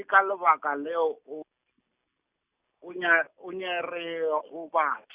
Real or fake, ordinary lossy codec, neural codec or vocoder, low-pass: real; Opus, 64 kbps; none; 3.6 kHz